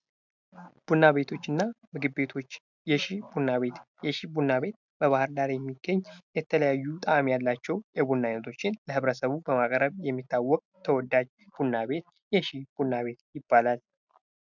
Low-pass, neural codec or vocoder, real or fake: 7.2 kHz; none; real